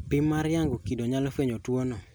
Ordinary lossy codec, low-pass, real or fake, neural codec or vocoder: none; none; real; none